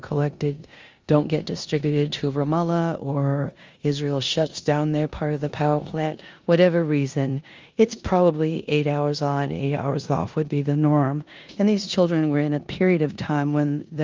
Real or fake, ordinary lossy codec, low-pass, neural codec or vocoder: fake; Opus, 32 kbps; 7.2 kHz; codec, 16 kHz in and 24 kHz out, 0.9 kbps, LongCat-Audio-Codec, four codebook decoder